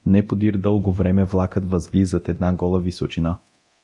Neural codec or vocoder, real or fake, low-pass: codec, 24 kHz, 0.9 kbps, DualCodec; fake; 10.8 kHz